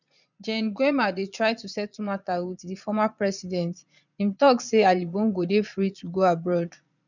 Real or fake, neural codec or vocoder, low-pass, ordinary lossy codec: fake; vocoder, 22.05 kHz, 80 mel bands, Vocos; 7.2 kHz; none